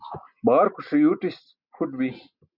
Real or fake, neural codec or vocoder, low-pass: real; none; 5.4 kHz